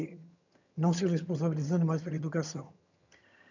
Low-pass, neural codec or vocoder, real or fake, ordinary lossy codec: 7.2 kHz; vocoder, 22.05 kHz, 80 mel bands, HiFi-GAN; fake; none